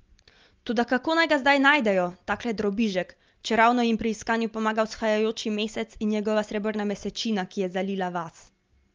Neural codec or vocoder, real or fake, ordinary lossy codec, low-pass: none; real; Opus, 32 kbps; 7.2 kHz